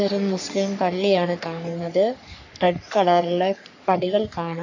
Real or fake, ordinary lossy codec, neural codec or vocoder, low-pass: fake; AAC, 48 kbps; codec, 44.1 kHz, 3.4 kbps, Pupu-Codec; 7.2 kHz